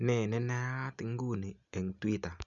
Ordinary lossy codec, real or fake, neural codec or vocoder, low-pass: none; real; none; 7.2 kHz